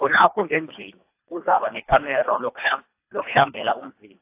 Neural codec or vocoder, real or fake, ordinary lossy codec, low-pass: codec, 24 kHz, 1.5 kbps, HILCodec; fake; AAC, 24 kbps; 3.6 kHz